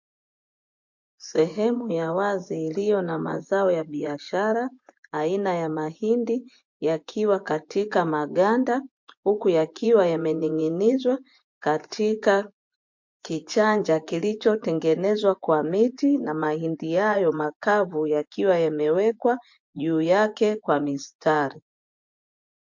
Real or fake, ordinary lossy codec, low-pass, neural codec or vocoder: fake; MP3, 48 kbps; 7.2 kHz; vocoder, 24 kHz, 100 mel bands, Vocos